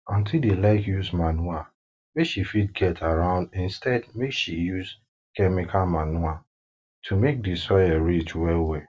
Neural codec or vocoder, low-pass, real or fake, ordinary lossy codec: none; none; real; none